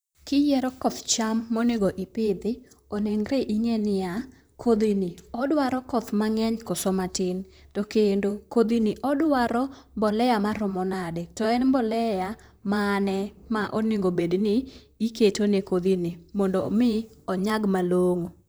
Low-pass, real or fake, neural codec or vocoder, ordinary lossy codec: none; fake; vocoder, 44.1 kHz, 128 mel bands, Pupu-Vocoder; none